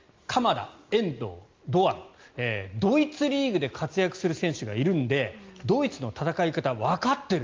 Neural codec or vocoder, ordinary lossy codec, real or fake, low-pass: none; Opus, 32 kbps; real; 7.2 kHz